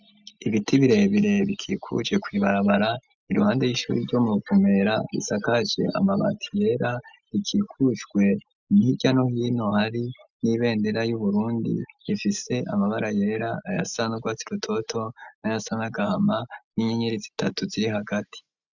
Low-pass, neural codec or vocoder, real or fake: 7.2 kHz; none; real